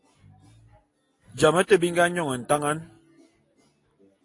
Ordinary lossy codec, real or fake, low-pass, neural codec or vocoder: AAC, 48 kbps; real; 10.8 kHz; none